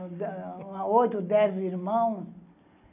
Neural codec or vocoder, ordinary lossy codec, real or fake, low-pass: none; none; real; 3.6 kHz